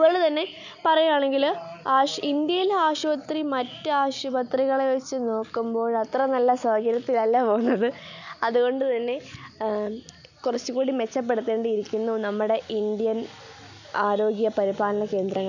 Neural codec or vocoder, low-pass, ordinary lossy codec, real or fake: none; 7.2 kHz; none; real